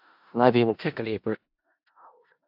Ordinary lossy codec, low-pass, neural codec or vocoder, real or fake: AAC, 48 kbps; 5.4 kHz; codec, 16 kHz in and 24 kHz out, 0.4 kbps, LongCat-Audio-Codec, four codebook decoder; fake